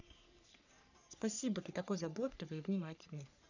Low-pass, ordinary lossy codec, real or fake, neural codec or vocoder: 7.2 kHz; none; fake; codec, 44.1 kHz, 3.4 kbps, Pupu-Codec